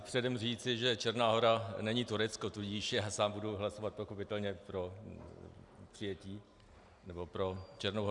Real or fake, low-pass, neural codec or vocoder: real; 10.8 kHz; none